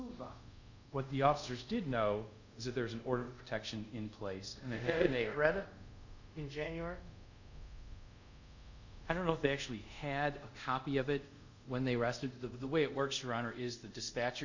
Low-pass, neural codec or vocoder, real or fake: 7.2 kHz; codec, 24 kHz, 0.5 kbps, DualCodec; fake